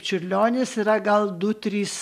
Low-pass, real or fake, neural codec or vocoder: 14.4 kHz; real; none